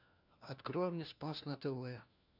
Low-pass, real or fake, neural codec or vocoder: 5.4 kHz; fake; codec, 16 kHz, 1 kbps, FunCodec, trained on LibriTTS, 50 frames a second